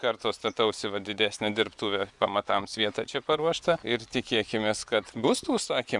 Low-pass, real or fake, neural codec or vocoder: 10.8 kHz; fake; vocoder, 24 kHz, 100 mel bands, Vocos